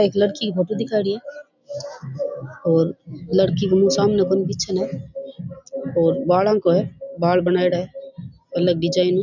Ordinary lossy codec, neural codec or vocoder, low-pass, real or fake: none; none; 7.2 kHz; real